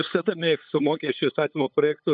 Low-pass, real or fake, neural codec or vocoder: 7.2 kHz; fake; codec, 16 kHz, 8 kbps, FunCodec, trained on LibriTTS, 25 frames a second